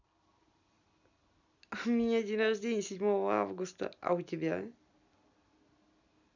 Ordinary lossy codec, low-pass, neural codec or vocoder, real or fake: none; 7.2 kHz; none; real